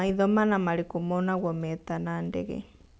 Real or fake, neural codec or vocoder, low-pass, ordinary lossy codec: real; none; none; none